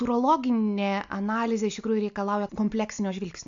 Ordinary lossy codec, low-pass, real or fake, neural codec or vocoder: AAC, 64 kbps; 7.2 kHz; real; none